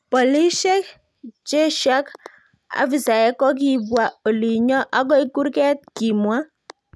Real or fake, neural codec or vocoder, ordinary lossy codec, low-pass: real; none; none; none